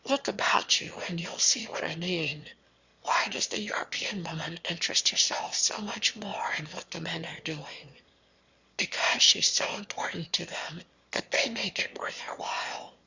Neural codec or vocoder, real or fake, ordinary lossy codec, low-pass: autoencoder, 22.05 kHz, a latent of 192 numbers a frame, VITS, trained on one speaker; fake; Opus, 64 kbps; 7.2 kHz